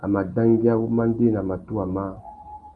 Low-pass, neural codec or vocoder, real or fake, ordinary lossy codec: 10.8 kHz; none; real; Opus, 32 kbps